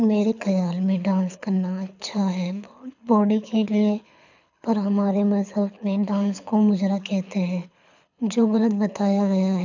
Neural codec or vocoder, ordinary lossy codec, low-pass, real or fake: codec, 24 kHz, 6 kbps, HILCodec; none; 7.2 kHz; fake